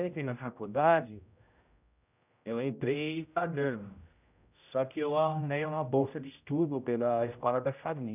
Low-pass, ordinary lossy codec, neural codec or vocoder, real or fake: 3.6 kHz; none; codec, 16 kHz, 0.5 kbps, X-Codec, HuBERT features, trained on general audio; fake